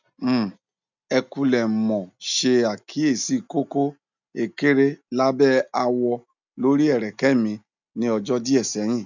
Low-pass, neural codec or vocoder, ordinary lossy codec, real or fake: 7.2 kHz; none; none; real